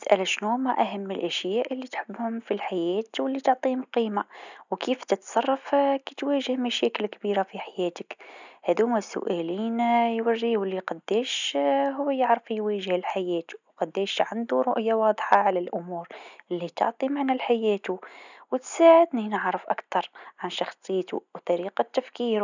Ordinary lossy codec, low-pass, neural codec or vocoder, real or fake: none; 7.2 kHz; none; real